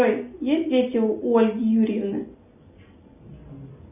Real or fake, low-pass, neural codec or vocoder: real; 3.6 kHz; none